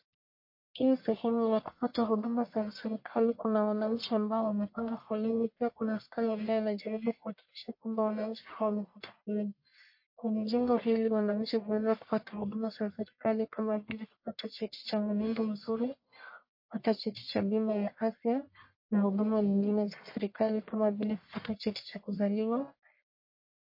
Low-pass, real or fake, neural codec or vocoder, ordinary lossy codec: 5.4 kHz; fake; codec, 44.1 kHz, 1.7 kbps, Pupu-Codec; MP3, 32 kbps